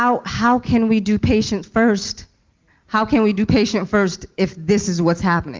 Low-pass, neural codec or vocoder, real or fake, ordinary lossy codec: 7.2 kHz; none; real; Opus, 32 kbps